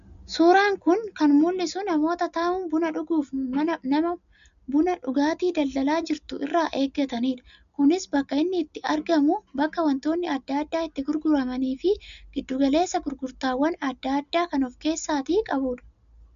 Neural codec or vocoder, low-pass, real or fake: none; 7.2 kHz; real